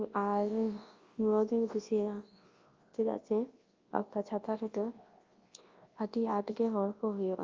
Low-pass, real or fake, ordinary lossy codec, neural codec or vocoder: 7.2 kHz; fake; Opus, 32 kbps; codec, 24 kHz, 0.9 kbps, WavTokenizer, large speech release